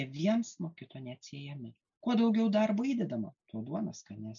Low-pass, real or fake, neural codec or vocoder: 7.2 kHz; real; none